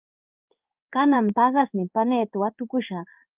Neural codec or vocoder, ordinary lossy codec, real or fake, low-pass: codec, 16 kHz in and 24 kHz out, 1 kbps, XY-Tokenizer; Opus, 32 kbps; fake; 3.6 kHz